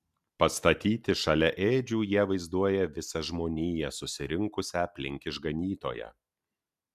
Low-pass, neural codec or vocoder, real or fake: 14.4 kHz; none; real